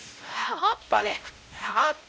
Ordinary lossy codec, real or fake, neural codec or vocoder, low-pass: none; fake; codec, 16 kHz, 0.5 kbps, X-Codec, WavLM features, trained on Multilingual LibriSpeech; none